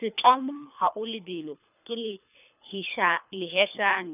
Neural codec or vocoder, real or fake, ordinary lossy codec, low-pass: codec, 24 kHz, 3 kbps, HILCodec; fake; none; 3.6 kHz